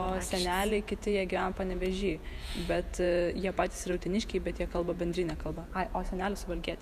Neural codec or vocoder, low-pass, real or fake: vocoder, 48 kHz, 128 mel bands, Vocos; 14.4 kHz; fake